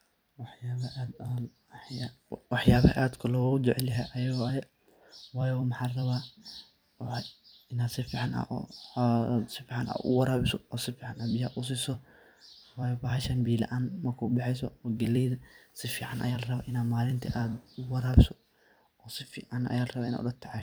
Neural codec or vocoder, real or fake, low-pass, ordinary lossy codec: vocoder, 44.1 kHz, 128 mel bands every 256 samples, BigVGAN v2; fake; none; none